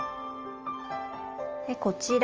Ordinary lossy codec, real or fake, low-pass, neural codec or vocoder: Opus, 24 kbps; real; 7.2 kHz; none